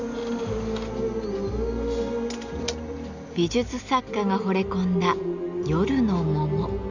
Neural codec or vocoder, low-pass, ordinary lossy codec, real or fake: none; 7.2 kHz; none; real